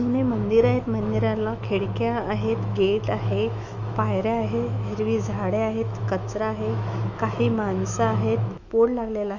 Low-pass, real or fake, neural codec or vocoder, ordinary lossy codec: 7.2 kHz; fake; autoencoder, 48 kHz, 128 numbers a frame, DAC-VAE, trained on Japanese speech; none